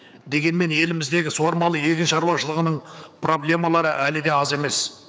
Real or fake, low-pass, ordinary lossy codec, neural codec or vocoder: fake; none; none; codec, 16 kHz, 4 kbps, X-Codec, HuBERT features, trained on general audio